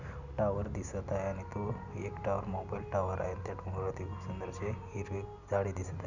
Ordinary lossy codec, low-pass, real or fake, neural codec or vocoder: none; 7.2 kHz; real; none